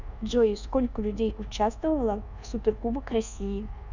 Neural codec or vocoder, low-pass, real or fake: codec, 24 kHz, 1.2 kbps, DualCodec; 7.2 kHz; fake